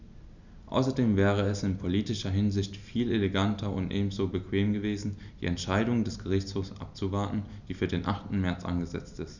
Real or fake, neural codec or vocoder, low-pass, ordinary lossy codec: real; none; 7.2 kHz; none